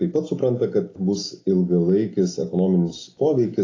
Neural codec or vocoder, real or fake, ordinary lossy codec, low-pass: none; real; AAC, 32 kbps; 7.2 kHz